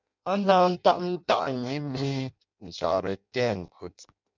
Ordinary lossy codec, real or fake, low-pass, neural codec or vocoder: MP3, 64 kbps; fake; 7.2 kHz; codec, 16 kHz in and 24 kHz out, 0.6 kbps, FireRedTTS-2 codec